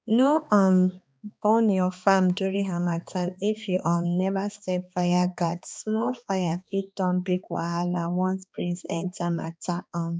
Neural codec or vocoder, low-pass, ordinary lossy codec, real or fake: codec, 16 kHz, 2 kbps, X-Codec, HuBERT features, trained on balanced general audio; none; none; fake